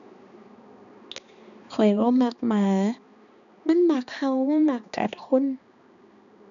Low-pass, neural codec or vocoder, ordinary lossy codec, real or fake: 7.2 kHz; codec, 16 kHz, 2 kbps, X-Codec, HuBERT features, trained on balanced general audio; none; fake